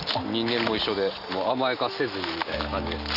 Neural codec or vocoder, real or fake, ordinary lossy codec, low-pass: none; real; none; 5.4 kHz